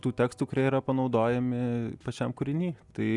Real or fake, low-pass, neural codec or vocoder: real; 10.8 kHz; none